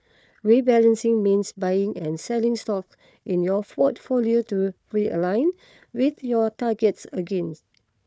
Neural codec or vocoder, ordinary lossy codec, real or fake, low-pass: codec, 16 kHz, 4 kbps, FunCodec, trained on Chinese and English, 50 frames a second; none; fake; none